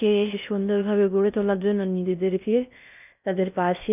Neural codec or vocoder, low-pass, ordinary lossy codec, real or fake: codec, 16 kHz in and 24 kHz out, 0.6 kbps, FocalCodec, streaming, 2048 codes; 3.6 kHz; none; fake